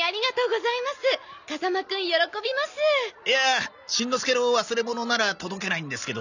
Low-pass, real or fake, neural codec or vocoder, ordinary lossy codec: 7.2 kHz; real; none; none